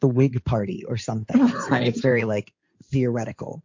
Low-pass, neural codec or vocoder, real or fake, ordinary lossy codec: 7.2 kHz; codec, 16 kHz in and 24 kHz out, 2.2 kbps, FireRedTTS-2 codec; fake; MP3, 48 kbps